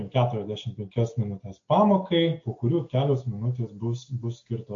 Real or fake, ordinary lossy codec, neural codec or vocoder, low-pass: real; AAC, 48 kbps; none; 7.2 kHz